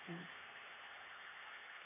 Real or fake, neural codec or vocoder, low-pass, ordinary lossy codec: fake; codec, 16 kHz, 4 kbps, X-Codec, HuBERT features, trained on LibriSpeech; 3.6 kHz; none